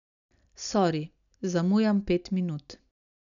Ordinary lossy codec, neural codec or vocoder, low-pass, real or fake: none; none; 7.2 kHz; real